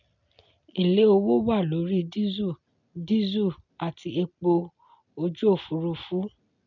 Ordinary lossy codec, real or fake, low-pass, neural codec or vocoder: none; real; 7.2 kHz; none